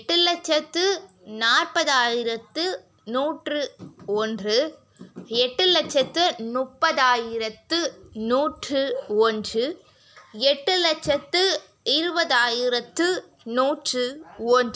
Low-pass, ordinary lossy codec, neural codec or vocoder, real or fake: none; none; none; real